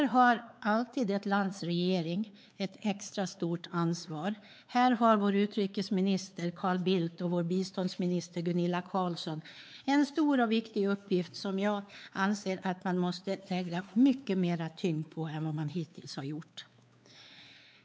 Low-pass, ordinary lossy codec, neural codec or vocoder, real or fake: none; none; codec, 16 kHz, 4 kbps, X-Codec, WavLM features, trained on Multilingual LibriSpeech; fake